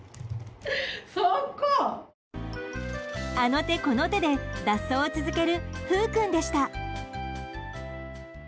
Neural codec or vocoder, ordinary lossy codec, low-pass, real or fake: none; none; none; real